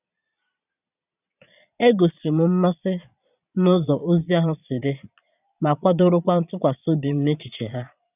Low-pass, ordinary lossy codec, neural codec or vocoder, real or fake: 3.6 kHz; none; vocoder, 22.05 kHz, 80 mel bands, Vocos; fake